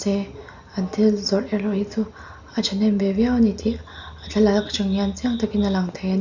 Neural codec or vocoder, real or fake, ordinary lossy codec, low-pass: none; real; Opus, 64 kbps; 7.2 kHz